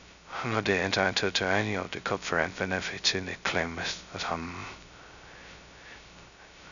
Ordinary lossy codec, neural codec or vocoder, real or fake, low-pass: none; codec, 16 kHz, 0.2 kbps, FocalCodec; fake; 7.2 kHz